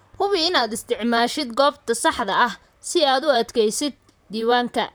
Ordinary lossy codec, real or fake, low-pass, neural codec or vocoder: none; fake; none; vocoder, 44.1 kHz, 128 mel bands, Pupu-Vocoder